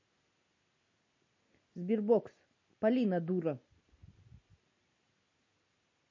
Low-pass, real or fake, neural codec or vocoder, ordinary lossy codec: 7.2 kHz; real; none; MP3, 32 kbps